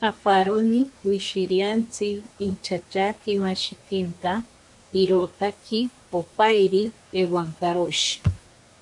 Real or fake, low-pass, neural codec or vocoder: fake; 10.8 kHz; codec, 24 kHz, 1 kbps, SNAC